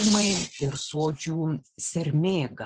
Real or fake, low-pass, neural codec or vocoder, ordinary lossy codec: fake; 9.9 kHz; vocoder, 24 kHz, 100 mel bands, Vocos; Opus, 16 kbps